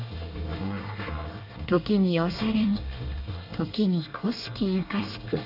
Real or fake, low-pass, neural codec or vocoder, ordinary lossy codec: fake; 5.4 kHz; codec, 24 kHz, 1 kbps, SNAC; none